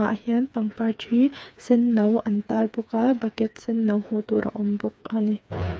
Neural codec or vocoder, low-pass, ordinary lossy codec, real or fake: codec, 16 kHz, 4 kbps, FreqCodec, smaller model; none; none; fake